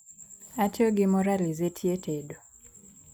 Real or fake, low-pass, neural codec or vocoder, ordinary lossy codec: real; none; none; none